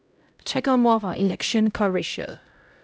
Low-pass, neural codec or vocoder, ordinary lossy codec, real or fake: none; codec, 16 kHz, 0.5 kbps, X-Codec, HuBERT features, trained on LibriSpeech; none; fake